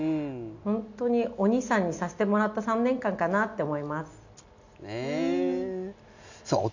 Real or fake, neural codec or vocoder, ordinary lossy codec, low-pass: real; none; none; 7.2 kHz